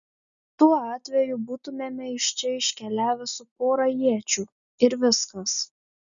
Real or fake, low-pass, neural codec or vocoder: real; 7.2 kHz; none